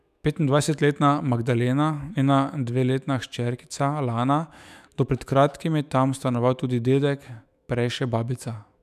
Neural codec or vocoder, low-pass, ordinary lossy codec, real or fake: autoencoder, 48 kHz, 128 numbers a frame, DAC-VAE, trained on Japanese speech; 14.4 kHz; none; fake